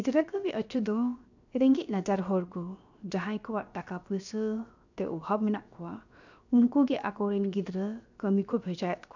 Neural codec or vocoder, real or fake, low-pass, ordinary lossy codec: codec, 16 kHz, 0.7 kbps, FocalCodec; fake; 7.2 kHz; none